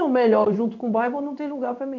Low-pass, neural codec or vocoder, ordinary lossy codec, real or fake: 7.2 kHz; codec, 16 kHz in and 24 kHz out, 1 kbps, XY-Tokenizer; none; fake